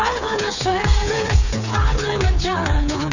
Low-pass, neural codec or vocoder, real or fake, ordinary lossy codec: 7.2 kHz; codec, 16 kHz, 4 kbps, FreqCodec, smaller model; fake; none